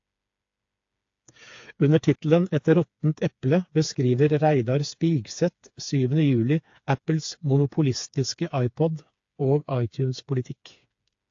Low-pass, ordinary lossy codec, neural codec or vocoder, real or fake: 7.2 kHz; AAC, 48 kbps; codec, 16 kHz, 4 kbps, FreqCodec, smaller model; fake